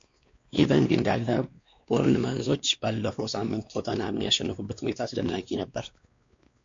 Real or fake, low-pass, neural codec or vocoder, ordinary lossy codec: fake; 7.2 kHz; codec, 16 kHz, 2 kbps, X-Codec, WavLM features, trained on Multilingual LibriSpeech; MP3, 48 kbps